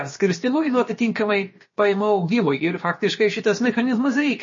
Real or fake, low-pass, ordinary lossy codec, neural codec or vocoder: fake; 7.2 kHz; MP3, 32 kbps; codec, 16 kHz, about 1 kbps, DyCAST, with the encoder's durations